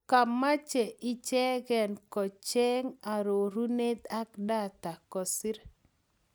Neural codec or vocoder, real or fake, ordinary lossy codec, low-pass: none; real; none; none